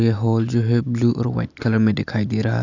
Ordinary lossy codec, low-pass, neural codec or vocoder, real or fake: none; 7.2 kHz; none; real